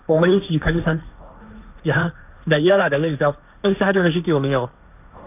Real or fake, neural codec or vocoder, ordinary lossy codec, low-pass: fake; codec, 16 kHz, 1.1 kbps, Voila-Tokenizer; none; 3.6 kHz